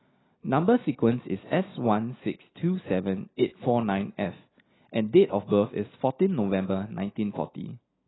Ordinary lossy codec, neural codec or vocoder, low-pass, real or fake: AAC, 16 kbps; none; 7.2 kHz; real